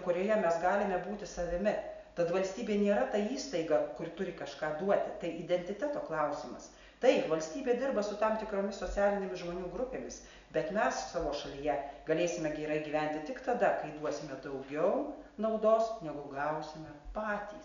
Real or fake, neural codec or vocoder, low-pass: real; none; 7.2 kHz